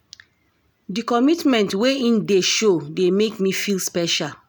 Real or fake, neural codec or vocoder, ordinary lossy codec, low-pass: real; none; none; none